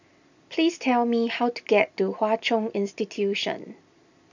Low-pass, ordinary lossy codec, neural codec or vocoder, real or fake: 7.2 kHz; none; none; real